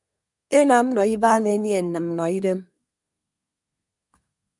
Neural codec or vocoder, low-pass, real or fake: codec, 24 kHz, 1 kbps, SNAC; 10.8 kHz; fake